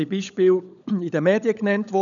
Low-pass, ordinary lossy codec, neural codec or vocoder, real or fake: 7.2 kHz; none; codec, 16 kHz, 16 kbps, FunCodec, trained on LibriTTS, 50 frames a second; fake